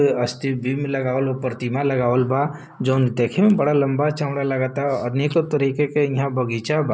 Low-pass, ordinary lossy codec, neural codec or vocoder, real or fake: none; none; none; real